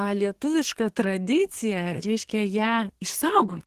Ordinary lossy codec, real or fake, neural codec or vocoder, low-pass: Opus, 16 kbps; fake; codec, 32 kHz, 1.9 kbps, SNAC; 14.4 kHz